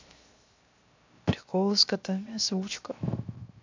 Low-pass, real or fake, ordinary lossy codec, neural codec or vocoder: 7.2 kHz; fake; MP3, 64 kbps; codec, 16 kHz, 0.7 kbps, FocalCodec